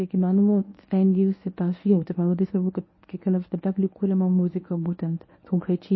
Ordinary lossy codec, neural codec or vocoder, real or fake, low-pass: MP3, 24 kbps; codec, 24 kHz, 0.9 kbps, WavTokenizer, medium speech release version 1; fake; 7.2 kHz